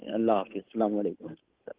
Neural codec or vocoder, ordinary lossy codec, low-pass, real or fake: codec, 16 kHz, 8 kbps, FunCodec, trained on Chinese and English, 25 frames a second; Opus, 16 kbps; 3.6 kHz; fake